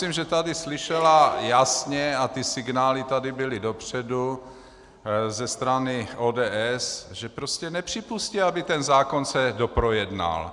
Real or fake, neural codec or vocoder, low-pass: real; none; 10.8 kHz